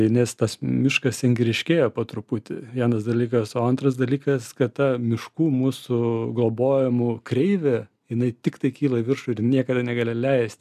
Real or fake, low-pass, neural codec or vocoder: real; 14.4 kHz; none